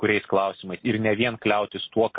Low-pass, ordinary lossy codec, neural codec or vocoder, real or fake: 7.2 kHz; MP3, 24 kbps; none; real